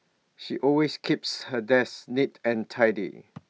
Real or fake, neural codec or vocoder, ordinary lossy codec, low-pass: real; none; none; none